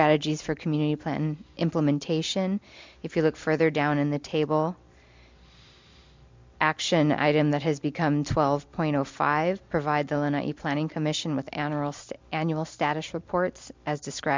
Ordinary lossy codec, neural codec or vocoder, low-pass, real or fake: MP3, 64 kbps; none; 7.2 kHz; real